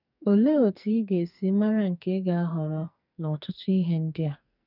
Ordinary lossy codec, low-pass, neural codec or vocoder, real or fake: none; 5.4 kHz; codec, 44.1 kHz, 2.6 kbps, SNAC; fake